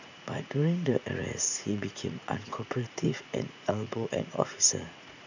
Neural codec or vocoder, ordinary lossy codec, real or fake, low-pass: vocoder, 44.1 kHz, 80 mel bands, Vocos; none; fake; 7.2 kHz